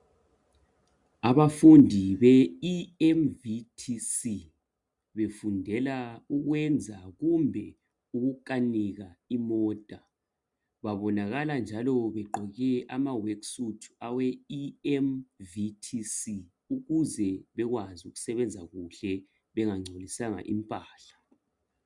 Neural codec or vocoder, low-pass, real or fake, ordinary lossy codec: none; 10.8 kHz; real; MP3, 96 kbps